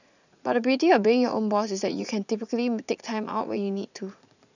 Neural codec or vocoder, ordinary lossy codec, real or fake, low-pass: vocoder, 44.1 kHz, 80 mel bands, Vocos; none; fake; 7.2 kHz